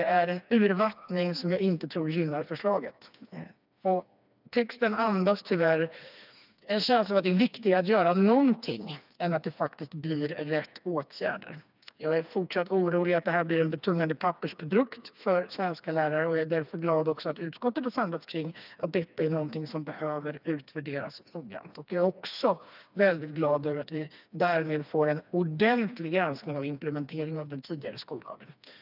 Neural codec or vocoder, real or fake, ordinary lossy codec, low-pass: codec, 16 kHz, 2 kbps, FreqCodec, smaller model; fake; none; 5.4 kHz